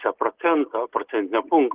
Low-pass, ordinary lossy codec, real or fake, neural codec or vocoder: 3.6 kHz; Opus, 16 kbps; real; none